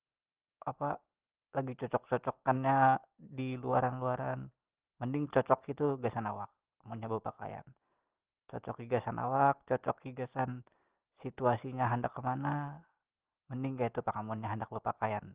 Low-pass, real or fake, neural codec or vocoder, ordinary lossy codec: 3.6 kHz; real; none; Opus, 16 kbps